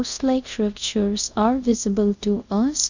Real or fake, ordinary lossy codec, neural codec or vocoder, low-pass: fake; none; codec, 24 kHz, 0.5 kbps, DualCodec; 7.2 kHz